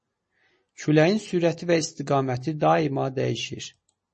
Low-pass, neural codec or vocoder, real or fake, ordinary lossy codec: 10.8 kHz; none; real; MP3, 32 kbps